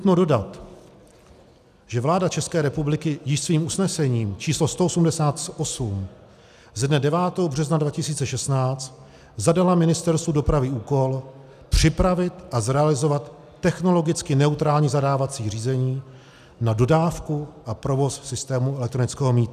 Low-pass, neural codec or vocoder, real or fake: 14.4 kHz; none; real